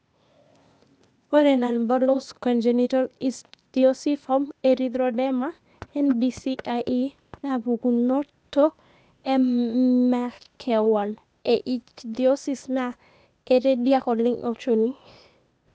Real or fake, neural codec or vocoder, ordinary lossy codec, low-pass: fake; codec, 16 kHz, 0.8 kbps, ZipCodec; none; none